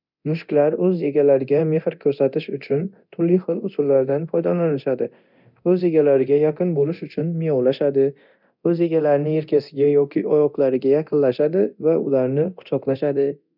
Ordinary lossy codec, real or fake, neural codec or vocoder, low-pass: none; fake; codec, 24 kHz, 0.9 kbps, DualCodec; 5.4 kHz